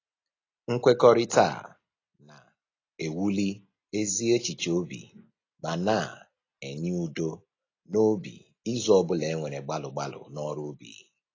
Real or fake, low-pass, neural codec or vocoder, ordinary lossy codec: real; 7.2 kHz; none; AAC, 32 kbps